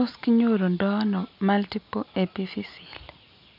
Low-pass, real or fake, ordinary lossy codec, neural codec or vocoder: 5.4 kHz; real; none; none